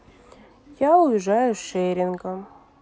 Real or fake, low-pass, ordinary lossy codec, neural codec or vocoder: real; none; none; none